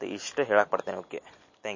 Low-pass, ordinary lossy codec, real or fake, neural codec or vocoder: 7.2 kHz; MP3, 32 kbps; real; none